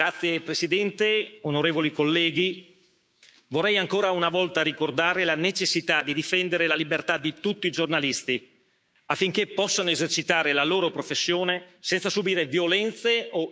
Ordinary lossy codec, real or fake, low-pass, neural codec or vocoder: none; fake; none; codec, 16 kHz, 6 kbps, DAC